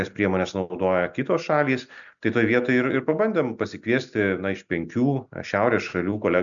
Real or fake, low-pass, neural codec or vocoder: real; 7.2 kHz; none